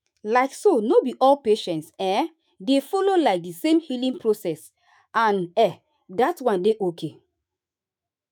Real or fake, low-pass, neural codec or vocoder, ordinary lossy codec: fake; none; autoencoder, 48 kHz, 128 numbers a frame, DAC-VAE, trained on Japanese speech; none